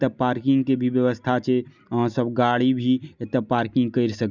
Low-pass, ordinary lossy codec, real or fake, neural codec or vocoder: 7.2 kHz; none; real; none